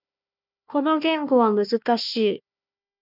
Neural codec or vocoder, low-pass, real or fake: codec, 16 kHz, 1 kbps, FunCodec, trained on Chinese and English, 50 frames a second; 5.4 kHz; fake